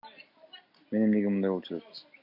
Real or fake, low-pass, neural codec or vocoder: real; 5.4 kHz; none